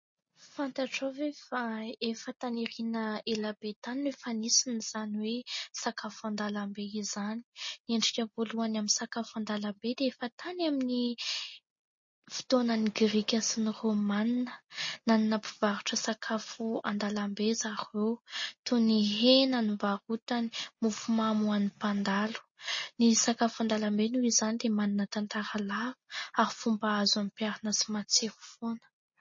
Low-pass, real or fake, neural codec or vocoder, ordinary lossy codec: 7.2 kHz; real; none; MP3, 32 kbps